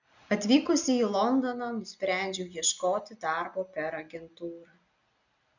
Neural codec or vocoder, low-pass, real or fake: none; 7.2 kHz; real